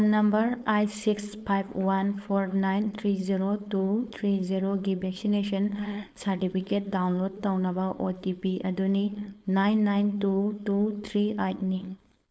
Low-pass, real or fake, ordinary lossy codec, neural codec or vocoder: none; fake; none; codec, 16 kHz, 4.8 kbps, FACodec